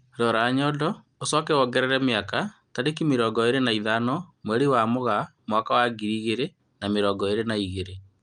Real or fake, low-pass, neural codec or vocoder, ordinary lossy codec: real; 9.9 kHz; none; Opus, 32 kbps